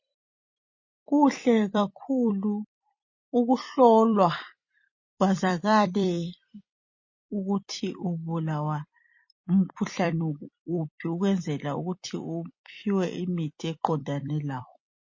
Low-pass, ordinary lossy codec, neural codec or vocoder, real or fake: 7.2 kHz; MP3, 32 kbps; vocoder, 44.1 kHz, 128 mel bands every 256 samples, BigVGAN v2; fake